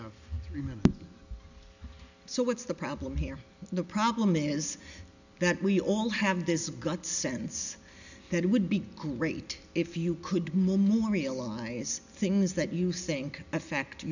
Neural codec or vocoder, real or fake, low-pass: none; real; 7.2 kHz